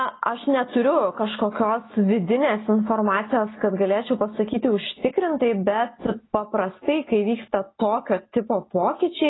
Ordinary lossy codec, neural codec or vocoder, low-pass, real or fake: AAC, 16 kbps; none; 7.2 kHz; real